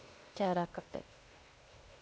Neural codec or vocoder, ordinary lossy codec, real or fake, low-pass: codec, 16 kHz, 0.8 kbps, ZipCodec; none; fake; none